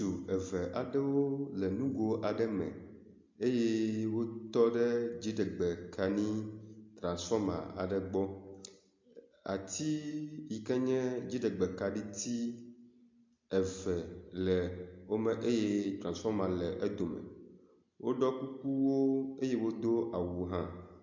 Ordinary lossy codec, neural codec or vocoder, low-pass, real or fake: MP3, 48 kbps; none; 7.2 kHz; real